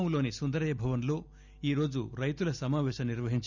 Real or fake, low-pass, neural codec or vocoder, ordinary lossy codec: real; 7.2 kHz; none; none